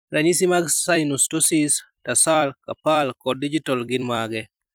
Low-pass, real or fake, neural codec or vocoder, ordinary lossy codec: none; fake; vocoder, 44.1 kHz, 128 mel bands every 256 samples, BigVGAN v2; none